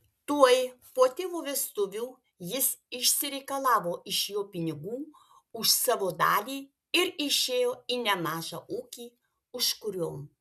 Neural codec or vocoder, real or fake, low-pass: none; real; 14.4 kHz